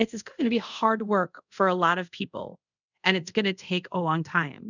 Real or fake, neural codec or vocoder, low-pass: fake; codec, 24 kHz, 0.5 kbps, DualCodec; 7.2 kHz